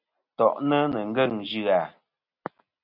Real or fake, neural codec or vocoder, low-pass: real; none; 5.4 kHz